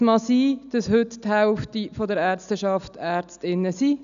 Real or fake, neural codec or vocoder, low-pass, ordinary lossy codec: real; none; 7.2 kHz; none